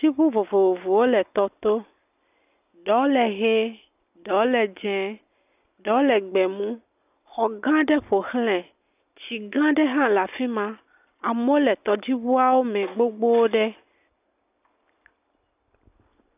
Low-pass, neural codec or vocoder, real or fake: 3.6 kHz; none; real